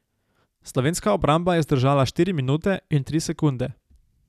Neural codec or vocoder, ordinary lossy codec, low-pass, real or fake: none; none; 14.4 kHz; real